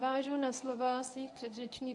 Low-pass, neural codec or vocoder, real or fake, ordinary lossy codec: 10.8 kHz; codec, 24 kHz, 0.9 kbps, WavTokenizer, medium speech release version 1; fake; AAC, 64 kbps